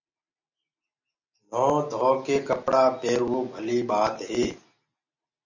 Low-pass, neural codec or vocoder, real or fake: 7.2 kHz; none; real